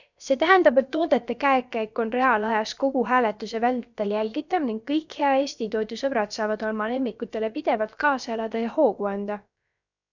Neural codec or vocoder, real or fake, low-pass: codec, 16 kHz, about 1 kbps, DyCAST, with the encoder's durations; fake; 7.2 kHz